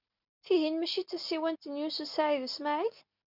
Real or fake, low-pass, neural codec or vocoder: real; 5.4 kHz; none